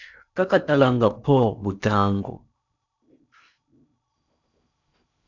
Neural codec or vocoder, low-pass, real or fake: codec, 16 kHz in and 24 kHz out, 0.8 kbps, FocalCodec, streaming, 65536 codes; 7.2 kHz; fake